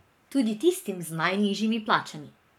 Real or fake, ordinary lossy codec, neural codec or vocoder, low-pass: fake; none; codec, 44.1 kHz, 7.8 kbps, Pupu-Codec; 19.8 kHz